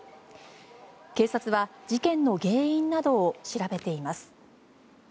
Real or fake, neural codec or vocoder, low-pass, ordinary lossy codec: real; none; none; none